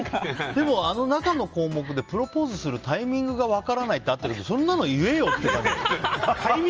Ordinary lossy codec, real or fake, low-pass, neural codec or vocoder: Opus, 24 kbps; real; 7.2 kHz; none